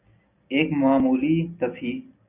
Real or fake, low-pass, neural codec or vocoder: real; 3.6 kHz; none